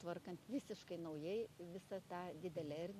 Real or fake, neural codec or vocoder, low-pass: real; none; 14.4 kHz